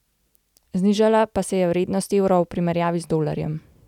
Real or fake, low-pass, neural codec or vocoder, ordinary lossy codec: real; 19.8 kHz; none; none